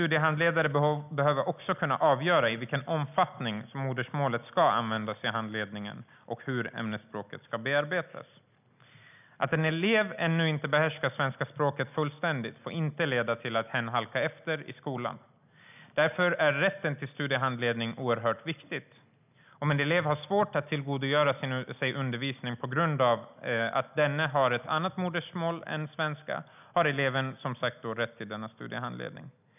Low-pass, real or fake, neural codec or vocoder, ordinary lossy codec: 3.6 kHz; real; none; none